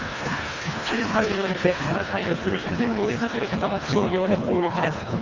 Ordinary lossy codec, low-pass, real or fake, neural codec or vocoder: Opus, 32 kbps; 7.2 kHz; fake; codec, 24 kHz, 1.5 kbps, HILCodec